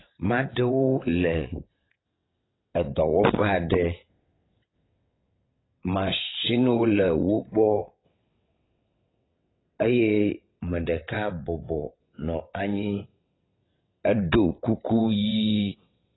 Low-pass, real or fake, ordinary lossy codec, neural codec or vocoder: 7.2 kHz; fake; AAC, 16 kbps; vocoder, 22.05 kHz, 80 mel bands, WaveNeXt